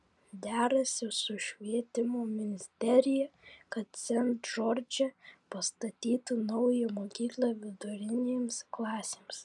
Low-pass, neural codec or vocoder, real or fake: 10.8 kHz; none; real